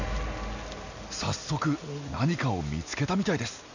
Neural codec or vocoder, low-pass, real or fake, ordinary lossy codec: none; 7.2 kHz; real; none